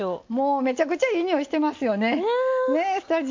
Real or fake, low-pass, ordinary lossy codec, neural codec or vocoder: real; 7.2 kHz; MP3, 48 kbps; none